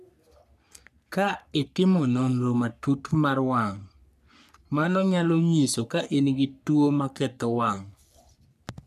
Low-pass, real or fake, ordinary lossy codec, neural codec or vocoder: 14.4 kHz; fake; none; codec, 44.1 kHz, 3.4 kbps, Pupu-Codec